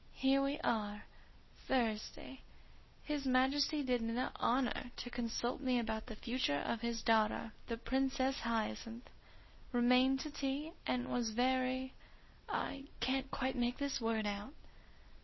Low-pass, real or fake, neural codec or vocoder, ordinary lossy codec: 7.2 kHz; real; none; MP3, 24 kbps